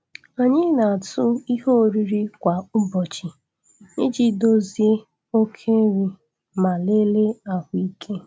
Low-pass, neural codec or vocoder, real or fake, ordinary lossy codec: none; none; real; none